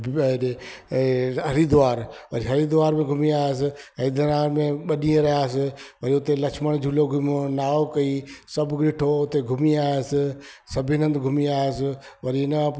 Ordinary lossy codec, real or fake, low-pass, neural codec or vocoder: none; real; none; none